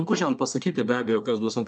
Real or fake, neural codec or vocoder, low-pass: fake; codec, 24 kHz, 1 kbps, SNAC; 9.9 kHz